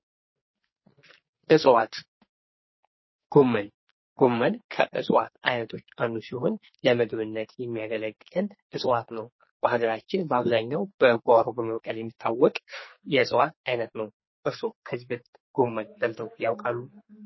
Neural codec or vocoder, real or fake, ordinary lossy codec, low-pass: codec, 44.1 kHz, 2.6 kbps, SNAC; fake; MP3, 24 kbps; 7.2 kHz